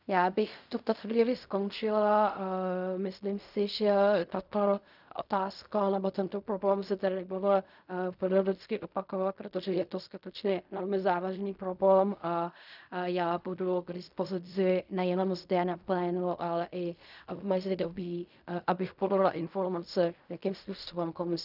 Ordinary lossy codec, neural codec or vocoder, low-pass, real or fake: none; codec, 16 kHz in and 24 kHz out, 0.4 kbps, LongCat-Audio-Codec, fine tuned four codebook decoder; 5.4 kHz; fake